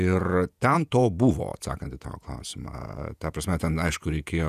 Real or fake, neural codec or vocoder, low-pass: fake; vocoder, 48 kHz, 128 mel bands, Vocos; 14.4 kHz